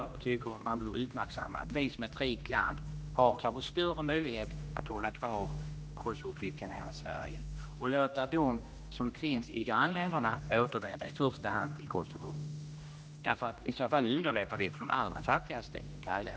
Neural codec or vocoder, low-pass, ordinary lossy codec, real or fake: codec, 16 kHz, 1 kbps, X-Codec, HuBERT features, trained on general audio; none; none; fake